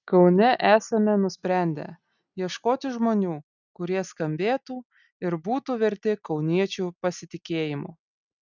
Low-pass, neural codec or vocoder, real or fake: 7.2 kHz; none; real